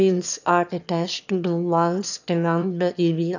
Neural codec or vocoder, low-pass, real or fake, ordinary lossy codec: autoencoder, 22.05 kHz, a latent of 192 numbers a frame, VITS, trained on one speaker; 7.2 kHz; fake; none